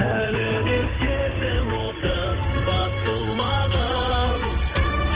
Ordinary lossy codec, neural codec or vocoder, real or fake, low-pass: Opus, 16 kbps; codec, 16 kHz in and 24 kHz out, 2.2 kbps, FireRedTTS-2 codec; fake; 3.6 kHz